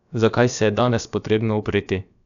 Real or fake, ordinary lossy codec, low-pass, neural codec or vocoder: fake; none; 7.2 kHz; codec, 16 kHz, about 1 kbps, DyCAST, with the encoder's durations